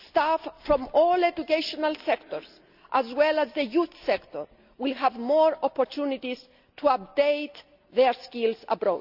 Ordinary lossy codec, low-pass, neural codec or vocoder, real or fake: none; 5.4 kHz; none; real